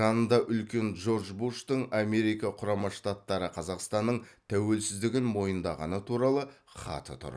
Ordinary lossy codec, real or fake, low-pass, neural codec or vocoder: none; real; none; none